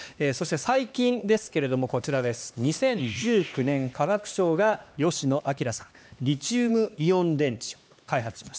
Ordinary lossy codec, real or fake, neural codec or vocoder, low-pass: none; fake; codec, 16 kHz, 2 kbps, X-Codec, HuBERT features, trained on LibriSpeech; none